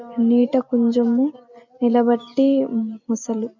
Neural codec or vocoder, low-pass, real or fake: none; 7.2 kHz; real